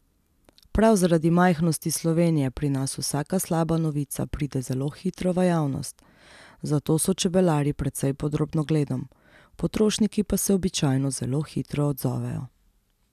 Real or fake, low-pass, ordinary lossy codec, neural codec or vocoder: real; 14.4 kHz; none; none